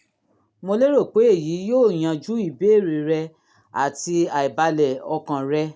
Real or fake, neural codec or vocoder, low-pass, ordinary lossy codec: real; none; none; none